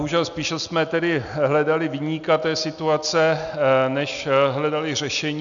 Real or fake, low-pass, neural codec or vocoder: real; 7.2 kHz; none